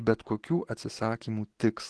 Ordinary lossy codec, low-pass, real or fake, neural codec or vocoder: Opus, 16 kbps; 10.8 kHz; real; none